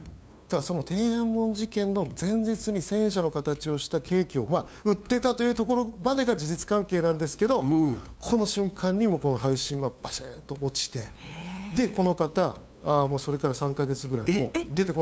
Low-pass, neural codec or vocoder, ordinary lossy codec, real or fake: none; codec, 16 kHz, 2 kbps, FunCodec, trained on LibriTTS, 25 frames a second; none; fake